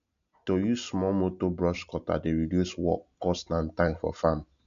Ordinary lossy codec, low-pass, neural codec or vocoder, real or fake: none; 7.2 kHz; none; real